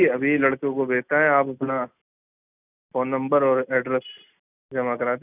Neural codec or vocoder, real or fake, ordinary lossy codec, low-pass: none; real; none; 3.6 kHz